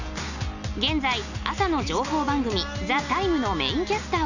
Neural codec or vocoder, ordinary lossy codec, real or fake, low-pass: none; none; real; 7.2 kHz